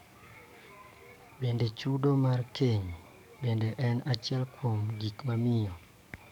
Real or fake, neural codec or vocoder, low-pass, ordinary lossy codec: fake; codec, 44.1 kHz, 7.8 kbps, DAC; 19.8 kHz; none